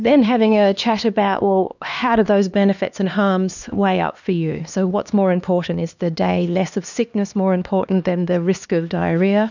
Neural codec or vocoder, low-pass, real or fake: codec, 16 kHz, 2 kbps, X-Codec, HuBERT features, trained on LibriSpeech; 7.2 kHz; fake